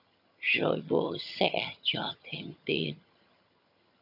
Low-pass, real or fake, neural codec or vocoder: 5.4 kHz; fake; vocoder, 22.05 kHz, 80 mel bands, HiFi-GAN